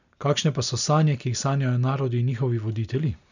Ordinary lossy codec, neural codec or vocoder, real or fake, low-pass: none; none; real; 7.2 kHz